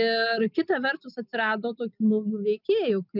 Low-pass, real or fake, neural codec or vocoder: 5.4 kHz; real; none